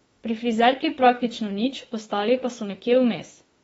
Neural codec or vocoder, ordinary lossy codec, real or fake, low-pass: autoencoder, 48 kHz, 32 numbers a frame, DAC-VAE, trained on Japanese speech; AAC, 24 kbps; fake; 19.8 kHz